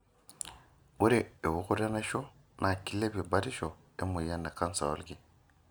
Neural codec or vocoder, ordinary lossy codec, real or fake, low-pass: none; none; real; none